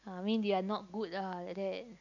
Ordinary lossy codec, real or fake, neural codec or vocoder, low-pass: none; real; none; 7.2 kHz